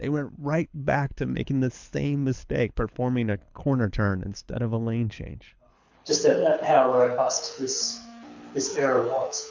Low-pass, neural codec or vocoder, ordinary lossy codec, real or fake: 7.2 kHz; codec, 24 kHz, 6 kbps, HILCodec; MP3, 64 kbps; fake